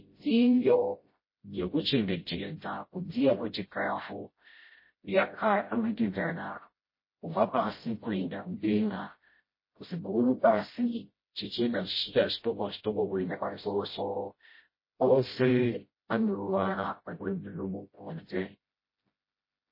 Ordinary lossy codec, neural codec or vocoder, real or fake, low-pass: MP3, 24 kbps; codec, 16 kHz, 0.5 kbps, FreqCodec, smaller model; fake; 5.4 kHz